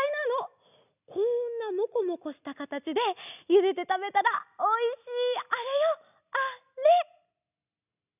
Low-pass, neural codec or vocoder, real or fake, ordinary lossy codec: 3.6 kHz; none; real; none